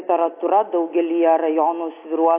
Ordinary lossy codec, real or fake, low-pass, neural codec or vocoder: AAC, 32 kbps; real; 3.6 kHz; none